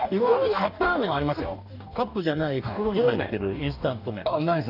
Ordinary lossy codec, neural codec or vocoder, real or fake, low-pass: none; codec, 44.1 kHz, 2.6 kbps, DAC; fake; 5.4 kHz